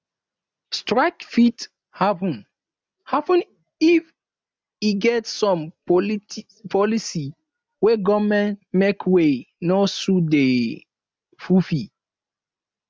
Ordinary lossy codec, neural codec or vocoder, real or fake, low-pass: none; none; real; none